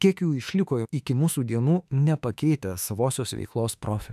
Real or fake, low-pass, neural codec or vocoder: fake; 14.4 kHz; autoencoder, 48 kHz, 32 numbers a frame, DAC-VAE, trained on Japanese speech